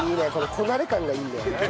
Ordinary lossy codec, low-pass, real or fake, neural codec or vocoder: none; none; real; none